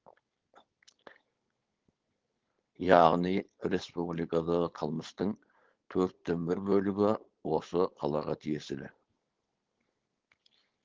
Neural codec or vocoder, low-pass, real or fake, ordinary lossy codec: codec, 16 kHz, 4.8 kbps, FACodec; 7.2 kHz; fake; Opus, 16 kbps